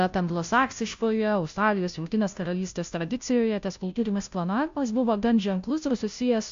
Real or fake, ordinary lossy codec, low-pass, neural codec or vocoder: fake; AAC, 64 kbps; 7.2 kHz; codec, 16 kHz, 0.5 kbps, FunCodec, trained on Chinese and English, 25 frames a second